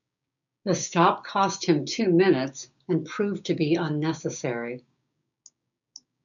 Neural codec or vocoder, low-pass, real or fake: codec, 16 kHz, 6 kbps, DAC; 7.2 kHz; fake